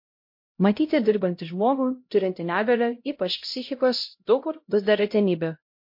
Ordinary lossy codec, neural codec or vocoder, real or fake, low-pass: MP3, 32 kbps; codec, 16 kHz, 0.5 kbps, X-Codec, HuBERT features, trained on LibriSpeech; fake; 5.4 kHz